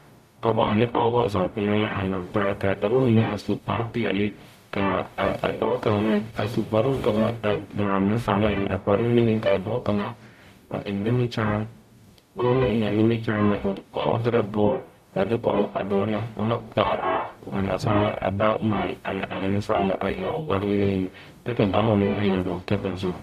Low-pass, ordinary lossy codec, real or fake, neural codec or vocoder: 14.4 kHz; AAC, 96 kbps; fake; codec, 44.1 kHz, 0.9 kbps, DAC